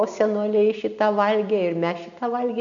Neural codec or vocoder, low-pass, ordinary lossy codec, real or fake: none; 7.2 kHz; MP3, 96 kbps; real